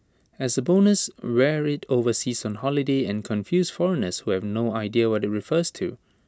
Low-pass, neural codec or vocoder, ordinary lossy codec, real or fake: none; none; none; real